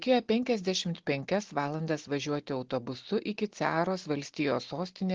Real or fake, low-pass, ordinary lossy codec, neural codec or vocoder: real; 7.2 kHz; Opus, 16 kbps; none